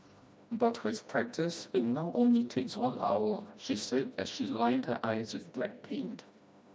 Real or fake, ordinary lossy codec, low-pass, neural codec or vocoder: fake; none; none; codec, 16 kHz, 1 kbps, FreqCodec, smaller model